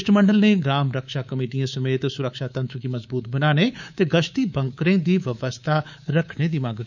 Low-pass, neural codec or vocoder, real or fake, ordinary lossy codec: 7.2 kHz; codec, 24 kHz, 3.1 kbps, DualCodec; fake; none